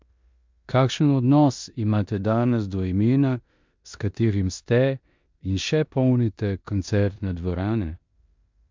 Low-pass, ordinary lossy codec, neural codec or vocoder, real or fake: 7.2 kHz; MP3, 64 kbps; codec, 16 kHz in and 24 kHz out, 0.9 kbps, LongCat-Audio-Codec, four codebook decoder; fake